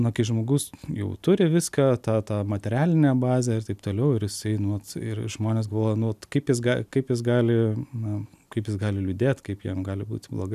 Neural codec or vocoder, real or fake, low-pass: none; real; 14.4 kHz